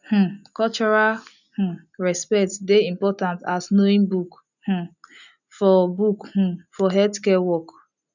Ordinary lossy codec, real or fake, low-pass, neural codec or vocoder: none; real; 7.2 kHz; none